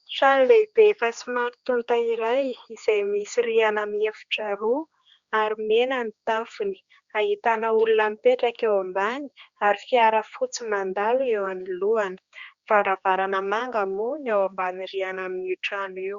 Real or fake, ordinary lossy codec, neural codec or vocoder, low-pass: fake; Opus, 64 kbps; codec, 16 kHz, 2 kbps, X-Codec, HuBERT features, trained on general audio; 7.2 kHz